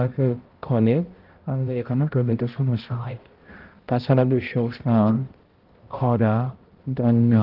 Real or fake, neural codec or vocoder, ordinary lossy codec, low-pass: fake; codec, 16 kHz, 0.5 kbps, X-Codec, HuBERT features, trained on balanced general audio; Opus, 16 kbps; 5.4 kHz